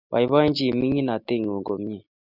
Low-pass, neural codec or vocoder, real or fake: 5.4 kHz; none; real